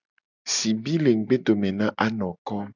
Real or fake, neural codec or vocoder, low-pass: real; none; 7.2 kHz